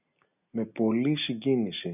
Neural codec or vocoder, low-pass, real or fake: none; 3.6 kHz; real